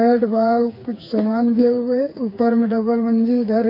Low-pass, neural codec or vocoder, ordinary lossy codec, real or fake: 5.4 kHz; codec, 16 kHz, 4 kbps, FreqCodec, smaller model; AAC, 32 kbps; fake